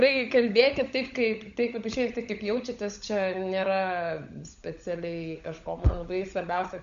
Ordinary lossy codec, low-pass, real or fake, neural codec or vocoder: MP3, 64 kbps; 7.2 kHz; fake; codec, 16 kHz, 16 kbps, FunCodec, trained on LibriTTS, 50 frames a second